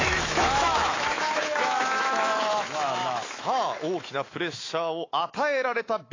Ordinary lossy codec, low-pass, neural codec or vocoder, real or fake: AAC, 32 kbps; 7.2 kHz; autoencoder, 48 kHz, 128 numbers a frame, DAC-VAE, trained on Japanese speech; fake